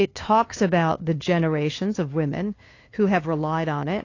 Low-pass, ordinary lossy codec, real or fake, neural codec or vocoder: 7.2 kHz; AAC, 32 kbps; fake; codec, 16 kHz, 2 kbps, FunCodec, trained on LibriTTS, 25 frames a second